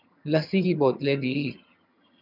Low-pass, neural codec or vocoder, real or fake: 5.4 kHz; vocoder, 22.05 kHz, 80 mel bands, HiFi-GAN; fake